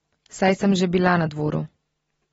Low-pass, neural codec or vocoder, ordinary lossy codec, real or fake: 10.8 kHz; none; AAC, 24 kbps; real